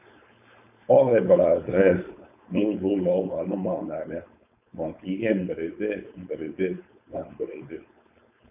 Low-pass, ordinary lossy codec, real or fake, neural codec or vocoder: 3.6 kHz; none; fake; codec, 16 kHz, 4.8 kbps, FACodec